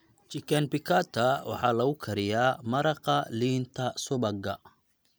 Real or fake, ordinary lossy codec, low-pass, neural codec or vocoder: real; none; none; none